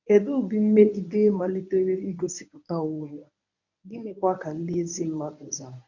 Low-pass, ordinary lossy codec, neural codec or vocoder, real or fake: 7.2 kHz; none; codec, 24 kHz, 0.9 kbps, WavTokenizer, medium speech release version 1; fake